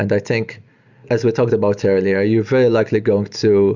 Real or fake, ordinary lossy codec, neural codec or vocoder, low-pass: real; Opus, 64 kbps; none; 7.2 kHz